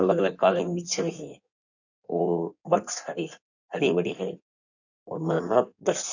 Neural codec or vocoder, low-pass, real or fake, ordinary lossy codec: codec, 16 kHz in and 24 kHz out, 1.1 kbps, FireRedTTS-2 codec; 7.2 kHz; fake; none